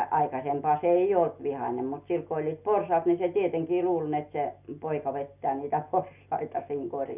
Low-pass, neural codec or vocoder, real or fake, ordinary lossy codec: 3.6 kHz; none; real; none